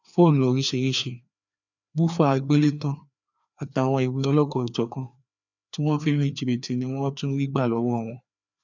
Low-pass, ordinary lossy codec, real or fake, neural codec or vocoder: 7.2 kHz; none; fake; codec, 16 kHz, 2 kbps, FreqCodec, larger model